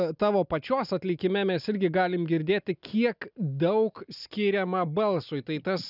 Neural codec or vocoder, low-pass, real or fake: none; 5.4 kHz; real